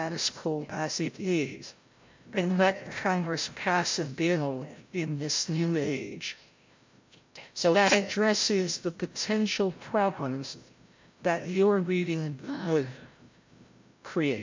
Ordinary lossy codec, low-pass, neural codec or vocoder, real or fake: MP3, 48 kbps; 7.2 kHz; codec, 16 kHz, 0.5 kbps, FreqCodec, larger model; fake